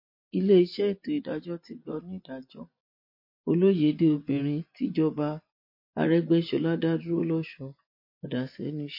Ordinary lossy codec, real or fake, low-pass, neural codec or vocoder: MP3, 32 kbps; fake; 5.4 kHz; vocoder, 24 kHz, 100 mel bands, Vocos